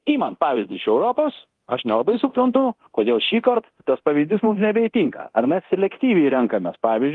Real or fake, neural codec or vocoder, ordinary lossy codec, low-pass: fake; codec, 24 kHz, 0.9 kbps, DualCodec; Opus, 24 kbps; 10.8 kHz